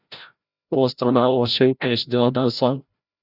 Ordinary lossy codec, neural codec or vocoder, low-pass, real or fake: Opus, 64 kbps; codec, 16 kHz, 0.5 kbps, FreqCodec, larger model; 5.4 kHz; fake